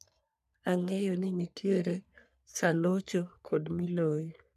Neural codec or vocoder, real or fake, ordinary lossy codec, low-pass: codec, 44.1 kHz, 3.4 kbps, Pupu-Codec; fake; none; 14.4 kHz